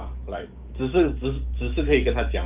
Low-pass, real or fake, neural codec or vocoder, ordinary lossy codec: 3.6 kHz; fake; codec, 44.1 kHz, 7.8 kbps, DAC; Opus, 32 kbps